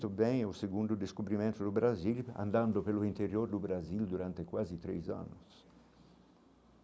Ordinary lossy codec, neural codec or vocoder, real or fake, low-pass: none; none; real; none